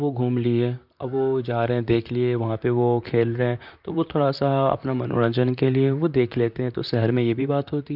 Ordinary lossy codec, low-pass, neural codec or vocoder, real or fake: none; 5.4 kHz; vocoder, 44.1 kHz, 128 mel bands, Pupu-Vocoder; fake